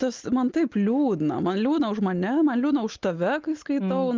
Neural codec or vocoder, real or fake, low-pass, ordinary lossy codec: none; real; 7.2 kHz; Opus, 32 kbps